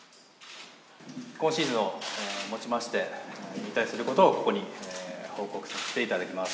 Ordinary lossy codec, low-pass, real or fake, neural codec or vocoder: none; none; real; none